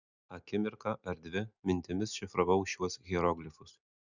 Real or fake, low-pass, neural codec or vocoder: real; 7.2 kHz; none